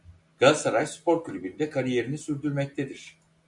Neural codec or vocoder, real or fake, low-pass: none; real; 10.8 kHz